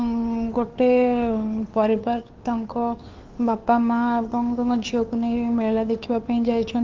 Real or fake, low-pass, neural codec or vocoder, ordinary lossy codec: fake; 7.2 kHz; codec, 16 kHz, 2 kbps, FunCodec, trained on Chinese and English, 25 frames a second; Opus, 32 kbps